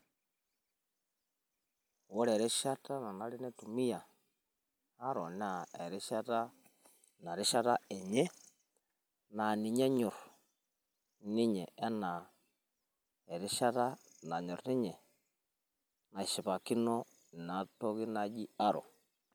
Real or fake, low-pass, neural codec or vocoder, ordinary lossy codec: real; none; none; none